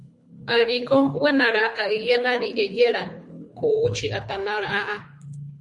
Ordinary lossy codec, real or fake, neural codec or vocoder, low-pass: MP3, 48 kbps; fake; codec, 24 kHz, 3 kbps, HILCodec; 10.8 kHz